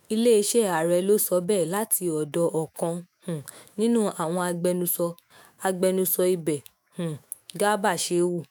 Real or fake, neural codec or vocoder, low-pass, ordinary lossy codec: fake; autoencoder, 48 kHz, 128 numbers a frame, DAC-VAE, trained on Japanese speech; none; none